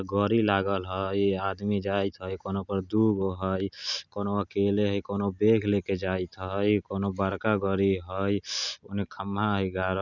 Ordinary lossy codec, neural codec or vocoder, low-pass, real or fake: none; none; 7.2 kHz; real